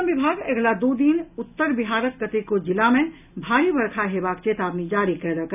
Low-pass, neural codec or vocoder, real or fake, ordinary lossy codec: 3.6 kHz; none; real; none